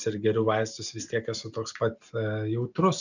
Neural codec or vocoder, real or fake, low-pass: none; real; 7.2 kHz